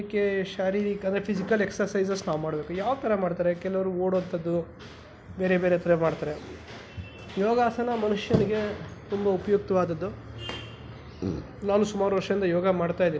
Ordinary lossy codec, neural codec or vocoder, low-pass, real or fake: none; none; none; real